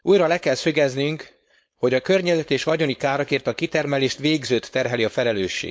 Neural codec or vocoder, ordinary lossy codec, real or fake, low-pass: codec, 16 kHz, 4.8 kbps, FACodec; none; fake; none